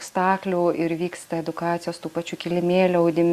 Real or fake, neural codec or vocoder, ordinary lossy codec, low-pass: real; none; Opus, 64 kbps; 14.4 kHz